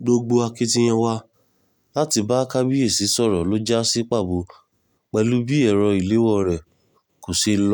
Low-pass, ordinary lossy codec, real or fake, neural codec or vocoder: none; none; real; none